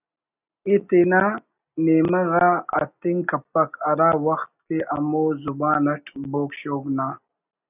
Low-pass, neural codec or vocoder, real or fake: 3.6 kHz; none; real